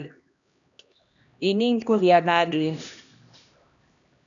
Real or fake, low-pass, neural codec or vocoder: fake; 7.2 kHz; codec, 16 kHz, 1 kbps, X-Codec, HuBERT features, trained on LibriSpeech